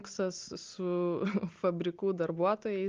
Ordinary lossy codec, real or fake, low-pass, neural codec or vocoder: Opus, 32 kbps; real; 7.2 kHz; none